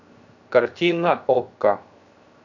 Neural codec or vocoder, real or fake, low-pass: codec, 16 kHz, 0.7 kbps, FocalCodec; fake; 7.2 kHz